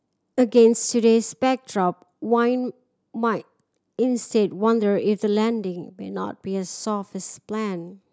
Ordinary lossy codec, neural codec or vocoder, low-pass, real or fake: none; none; none; real